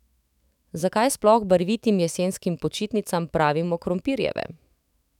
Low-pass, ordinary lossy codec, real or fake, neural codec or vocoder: 19.8 kHz; none; fake; autoencoder, 48 kHz, 128 numbers a frame, DAC-VAE, trained on Japanese speech